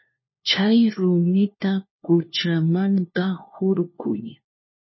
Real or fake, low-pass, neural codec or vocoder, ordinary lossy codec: fake; 7.2 kHz; codec, 16 kHz, 1 kbps, FunCodec, trained on LibriTTS, 50 frames a second; MP3, 24 kbps